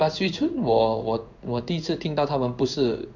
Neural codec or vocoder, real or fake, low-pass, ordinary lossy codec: codec, 16 kHz in and 24 kHz out, 1 kbps, XY-Tokenizer; fake; 7.2 kHz; none